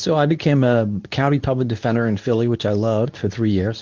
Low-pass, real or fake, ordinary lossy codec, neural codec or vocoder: 7.2 kHz; fake; Opus, 24 kbps; codec, 24 kHz, 0.9 kbps, WavTokenizer, medium speech release version 2